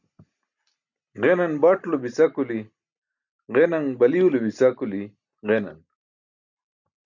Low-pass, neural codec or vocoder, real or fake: 7.2 kHz; none; real